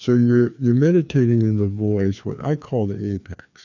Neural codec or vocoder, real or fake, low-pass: codec, 16 kHz, 2 kbps, FreqCodec, larger model; fake; 7.2 kHz